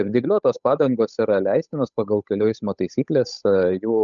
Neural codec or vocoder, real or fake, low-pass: codec, 16 kHz, 8 kbps, FunCodec, trained on Chinese and English, 25 frames a second; fake; 7.2 kHz